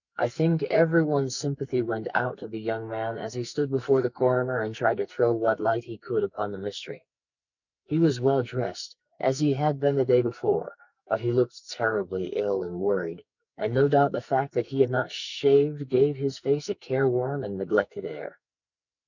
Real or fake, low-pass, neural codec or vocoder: fake; 7.2 kHz; codec, 44.1 kHz, 2.6 kbps, SNAC